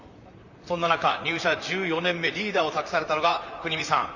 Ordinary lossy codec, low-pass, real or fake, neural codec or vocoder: Opus, 64 kbps; 7.2 kHz; fake; vocoder, 44.1 kHz, 128 mel bands, Pupu-Vocoder